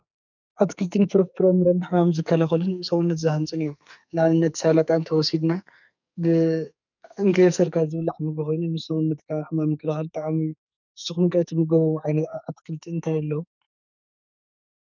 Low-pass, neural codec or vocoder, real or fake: 7.2 kHz; codec, 44.1 kHz, 2.6 kbps, SNAC; fake